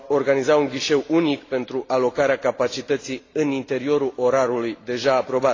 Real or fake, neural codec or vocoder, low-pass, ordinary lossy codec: real; none; 7.2 kHz; AAC, 32 kbps